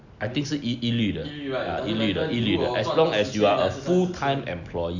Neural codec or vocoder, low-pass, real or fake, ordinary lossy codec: none; 7.2 kHz; real; none